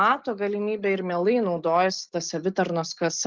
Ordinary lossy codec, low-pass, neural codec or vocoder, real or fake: Opus, 24 kbps; 7.2 kHz; none; real